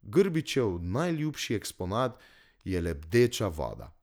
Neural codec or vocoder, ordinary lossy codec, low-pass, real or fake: none; none; none; real